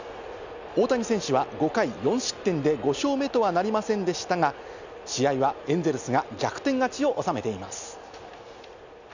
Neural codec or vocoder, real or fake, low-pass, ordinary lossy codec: none; real; 7.2 kHz; none